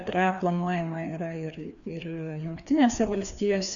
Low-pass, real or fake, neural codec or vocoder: 7.2 kHz; fake; codec, 16 kHz, 2 kbps, FreqCodec, larger model